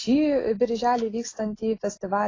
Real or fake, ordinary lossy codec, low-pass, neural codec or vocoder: real; AAC, 32 kbps; 7.2 kHz; none